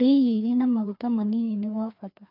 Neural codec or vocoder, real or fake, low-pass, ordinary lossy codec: codec, 16 kHz, 2 kbps, FreqCodec, larger model; fake; 5.4 kHz; none